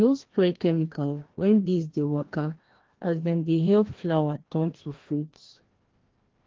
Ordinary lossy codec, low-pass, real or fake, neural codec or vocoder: Opus, 16 kbps; 7.2 kHz; fake; codec, 16 kHz, 1 kbps, FreqCodec, larger model